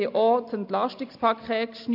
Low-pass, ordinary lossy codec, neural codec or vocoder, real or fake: 5.4 kHz; AAC, 48 kbps; none; real